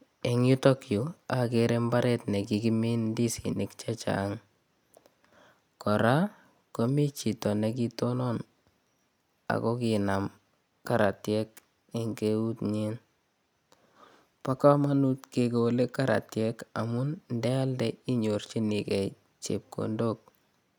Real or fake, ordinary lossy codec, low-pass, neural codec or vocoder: real; none; none; none